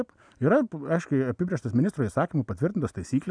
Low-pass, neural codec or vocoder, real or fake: 9.9 kHz; none; real